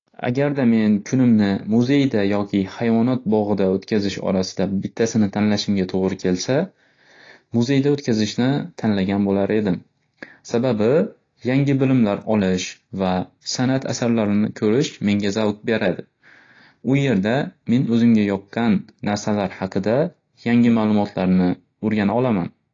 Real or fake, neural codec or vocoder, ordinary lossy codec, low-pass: real; none; AAC, 32 kbps; 7.2 kHz